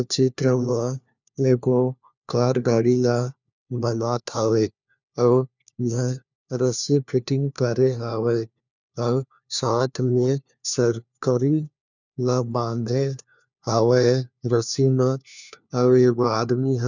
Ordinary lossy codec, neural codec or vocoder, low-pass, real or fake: none; codec, 16 kHz, 1 kbps, FunCodec, trained on LibriTTS, 50 frames a second; 7.2 kHz; fake